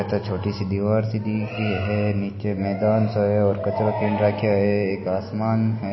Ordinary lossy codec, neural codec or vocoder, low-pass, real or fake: MP3, 24 kbps; none; 7.2 kHz; real